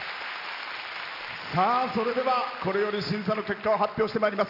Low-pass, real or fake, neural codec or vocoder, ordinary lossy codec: 5.4 kHz; real; none; none